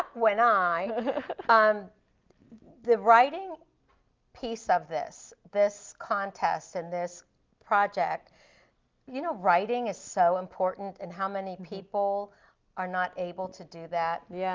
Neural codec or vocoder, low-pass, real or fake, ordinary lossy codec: none; 7.2 kHz; real; Opus, 32 kbps